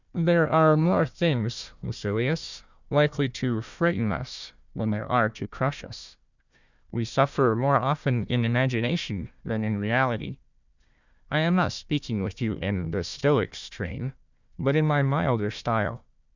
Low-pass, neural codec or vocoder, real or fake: 7.2 kHz; codec, 16 kHz, 1 kbps, FunCodec, trained on Chinese and English, 50 frames a second; fake